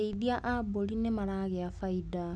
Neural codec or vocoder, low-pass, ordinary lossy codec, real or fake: none; none; none; real